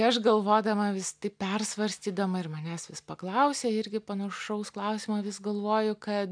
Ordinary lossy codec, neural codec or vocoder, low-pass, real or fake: MP3, 96 kbps; none; 9.9 kHz; real